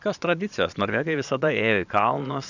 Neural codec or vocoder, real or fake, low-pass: vocoder, 22.05 kHz, 80 mel bands, Vocos; fake; 7.2 kHz